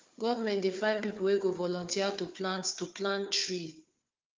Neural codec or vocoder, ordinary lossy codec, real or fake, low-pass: codec, 16 kHz, 4 kbps, FunCodec, trained on Chinese and English, 50 frames a second; Opus, 24 kbps; fake; 7.2 kHz